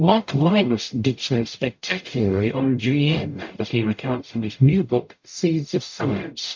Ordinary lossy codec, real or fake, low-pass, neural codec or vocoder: MP3, 48 kbps; fake; 7.2 kHz; codec, 44.1 kHz, 0.9 kbps, DAC